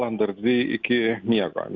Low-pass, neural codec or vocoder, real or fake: 7.2 kHz; none; real